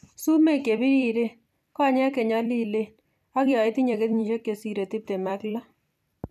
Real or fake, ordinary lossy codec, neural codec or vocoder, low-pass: fake; none; vocoder, 44.1 kHz, 128 mel bands every 256 samples, BigVGAN v2; 14.4 kHz